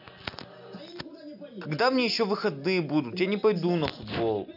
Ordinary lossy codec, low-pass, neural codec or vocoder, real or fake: none; 5.4 kHz; none; real